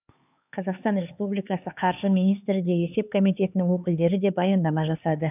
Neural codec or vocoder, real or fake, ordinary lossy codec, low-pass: codec, 16 kHz, 4 kbps, X-Codec, HuBERT features, trained on LibriSpeech; fake; none; 3.6 kHz